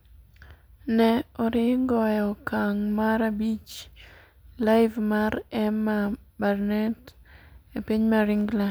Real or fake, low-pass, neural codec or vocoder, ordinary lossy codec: real; none; none; none